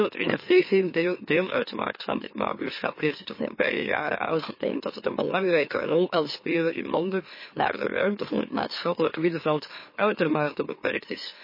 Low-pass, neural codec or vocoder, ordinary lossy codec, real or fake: 5.4 kHz; autoencoder, 44.1 kHz, a latent of 192 numbers a frame, MeloTTS; MP3, 24 kbps; fake